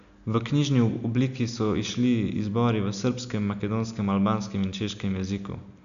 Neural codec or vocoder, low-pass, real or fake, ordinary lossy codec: none; 7.2 kHz; real; none